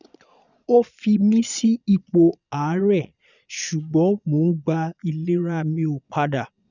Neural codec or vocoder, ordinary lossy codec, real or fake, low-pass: vocoder, 22.05 kHz, 80 mel bands, Vocos; none; fake; 7.2 kHz